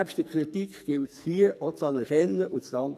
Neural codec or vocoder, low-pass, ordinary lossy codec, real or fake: codec, 44.1 kHz, 2.6 kbps, SNAC; 14.4 kHz; none; fake